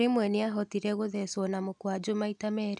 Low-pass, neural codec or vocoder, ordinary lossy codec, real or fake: 10.8 kHz; none; none; real